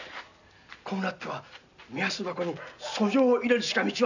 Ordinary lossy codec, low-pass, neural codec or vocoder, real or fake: none; 7.2 kHz; none; real